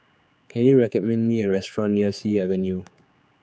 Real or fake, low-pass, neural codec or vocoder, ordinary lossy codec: fake; none; codec, 16 kHz, 4 kbps, X-Codec, HuBERT features, trained on general audio; none